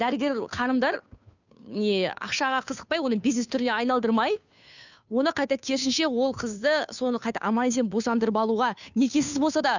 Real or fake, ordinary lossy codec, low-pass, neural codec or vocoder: fake; none; 7.2 kHz; codec, 16 kHz, 2 kbps, FunCodec, trained on Chinese and English, 25 frames a second